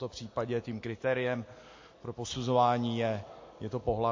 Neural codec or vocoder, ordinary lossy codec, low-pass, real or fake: none; MP3, 32 kbps; 7.2 kHz; real